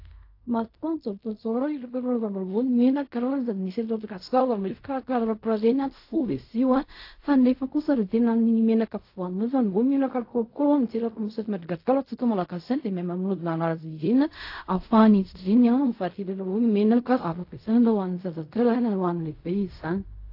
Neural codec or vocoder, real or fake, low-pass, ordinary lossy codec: codec, 16 kHz in and 24 kHz out, 0.4 kbps, LongCat-Audio-Codec, fine tuned four codebook decoder; fake; 5.4 kHz; AAC, 32 kbps